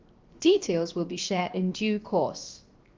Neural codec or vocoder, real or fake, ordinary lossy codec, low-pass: codec, 16 kHz, 0.7 kbps, FocalCodec; fake; Opus, 32 kbps; 7.2 kHz